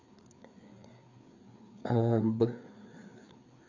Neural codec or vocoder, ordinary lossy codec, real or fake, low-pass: codec, 16 kHz, 4 kbps, FreqCodec, larger model; MP3, 64 kbps; fake; 7.2 kHz